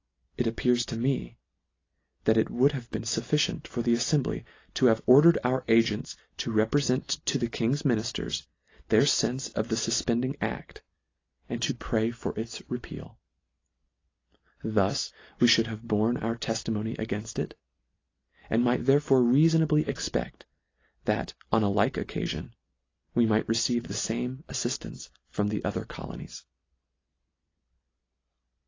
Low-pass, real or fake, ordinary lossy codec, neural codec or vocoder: 7.2 kHz; real; AAC, 32 kbps; none